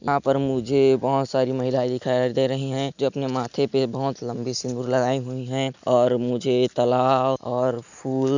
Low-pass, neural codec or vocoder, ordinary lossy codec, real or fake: 7.2 kHz; none; none; real